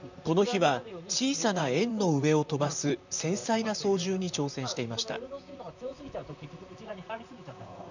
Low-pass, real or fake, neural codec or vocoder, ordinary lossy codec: 7.2 kHz; fake; vocoder, 44.1 kHz, 128 mel bands, Pupu-Vocoder; none